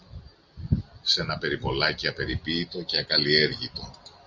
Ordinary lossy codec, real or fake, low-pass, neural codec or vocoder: Opus, 64 kbps; real; 7.2 kHz; none